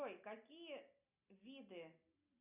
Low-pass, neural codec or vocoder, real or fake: 3.6 kHz; none; real